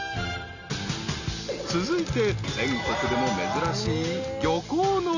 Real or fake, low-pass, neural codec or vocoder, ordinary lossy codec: real; 7.2 kHz; none; none